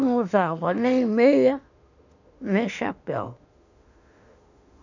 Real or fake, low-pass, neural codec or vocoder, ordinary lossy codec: fake; 7.2 kHz; autoencoder, 48 kHz, 32 numbers a frame, DAC-VAE, trained on Japanese speech; none